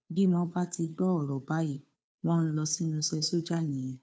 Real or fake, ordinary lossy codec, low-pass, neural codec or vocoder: fake; none; none; codec, 16 kHz, 2 kbps, FunCodec, trained on Chinese and English, 25 frames a second